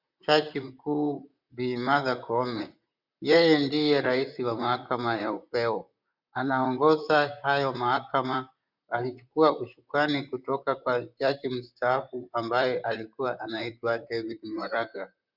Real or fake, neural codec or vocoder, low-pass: fake; vocoder, 44.1 kHz, 128 mel bands, Pupu-Vocoder; 5.4 kHz